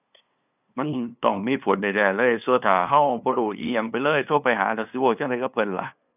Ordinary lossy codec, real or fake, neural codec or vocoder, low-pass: none; fake; codec, 16 kHz, 2 kbps, FunCodec, trained on LibriTTS, 25 frames a second; 3.6 kHz